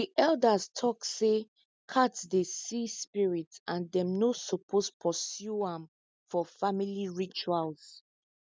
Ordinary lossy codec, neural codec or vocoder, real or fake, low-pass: none; none; real; none